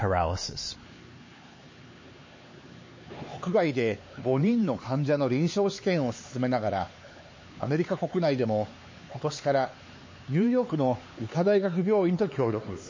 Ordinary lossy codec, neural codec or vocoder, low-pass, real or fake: MP3, 32 kbps; codec, 16 kHz, 4 kbps, X-Codec, HuBERT features, trained on LibriSpeech; 7.2 kHz; fake